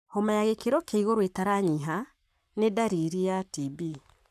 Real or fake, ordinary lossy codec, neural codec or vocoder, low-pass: fake; MP3, 96 kbps; codec, 44.1 kHz, 7.8 kbps, Pupu-Codec; 14.4 kHz